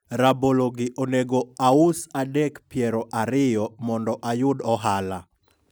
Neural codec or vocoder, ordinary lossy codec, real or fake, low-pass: none; none; real; none